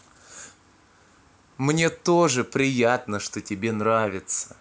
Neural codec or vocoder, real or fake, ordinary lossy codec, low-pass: none; real; none; none